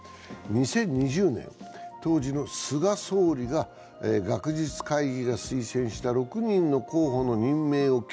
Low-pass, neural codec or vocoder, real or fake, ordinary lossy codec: none; none; real; none